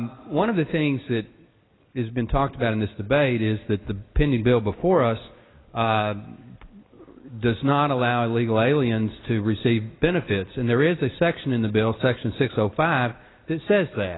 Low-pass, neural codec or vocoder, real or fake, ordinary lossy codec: 7.2 kHz; none; real; AAC, 16 kbps